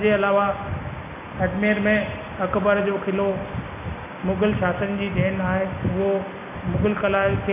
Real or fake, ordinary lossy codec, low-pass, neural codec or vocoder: real; none; 3.6 kHz; none